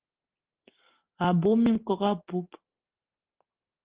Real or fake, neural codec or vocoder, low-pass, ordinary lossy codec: real; none; 3.6 kHz; Opus, 16 kbps